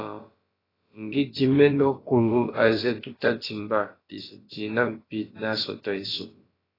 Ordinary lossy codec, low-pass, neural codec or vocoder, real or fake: AAC, 24 kbps; 5.4 kHz; codec, 16 kHz, about 1 kbps, DyCAST, with the encoder's durations; fake